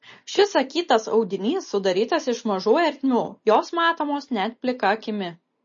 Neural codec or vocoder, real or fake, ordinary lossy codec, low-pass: none; real; MP3, 32 kbps; 7.2 kHz